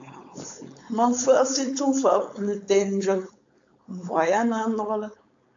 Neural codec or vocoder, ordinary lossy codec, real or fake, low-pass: codec, 16 kHz, 4.8 kbps, FACodec; AAC, 64 kbps; fake; 7.2 kHz